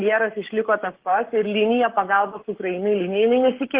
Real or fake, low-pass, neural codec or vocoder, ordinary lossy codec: fake; 3.6 kHz; codec, 44.1 kHz, 7.8 kbps, Pupu-Codec; Opus, 16 kbps